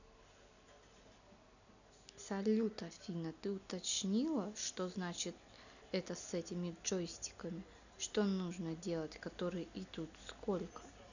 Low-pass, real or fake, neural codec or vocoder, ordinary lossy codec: 7.2 kHz; real; none; none